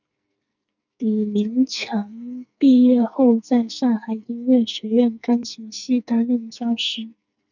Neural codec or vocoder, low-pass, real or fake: codec, 44.1 kHz, 2.6 kbps, SNAC; 7.2 kHz; fake